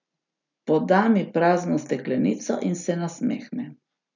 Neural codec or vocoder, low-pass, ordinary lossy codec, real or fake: none; 7.2 kHz; none; real